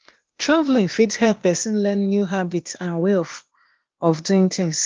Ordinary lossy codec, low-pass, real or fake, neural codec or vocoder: Opus, 24 kbps; 7.2 kHz; fake; codec, 16 kHz, 0.8 kbps, ZipCodec